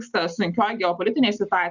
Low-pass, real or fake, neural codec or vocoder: 7.2 kHz; real; none